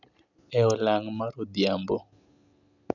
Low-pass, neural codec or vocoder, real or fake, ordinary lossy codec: 7.2 kHz; none; real; none